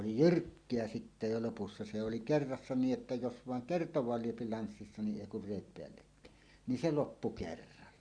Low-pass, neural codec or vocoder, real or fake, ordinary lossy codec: 9.9 kHz; none; real; Opus, 64 kbps